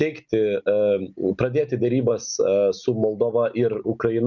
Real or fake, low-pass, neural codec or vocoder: real; 7.2 kHz; none